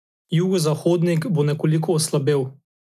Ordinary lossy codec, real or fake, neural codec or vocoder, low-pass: none; real; none; 14.4 kHz